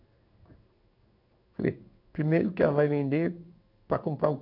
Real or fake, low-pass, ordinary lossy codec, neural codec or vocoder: fake; 5.4 kHz; none; codec, 16 kHz in and 24 kHz out, 1 kbps, XY-Tokenizer